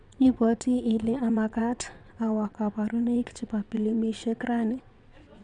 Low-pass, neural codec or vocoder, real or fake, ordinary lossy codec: 9.9 kHz; vocoder, 22.05 kHz, 80 mel bands, WaveNeXt; fake; none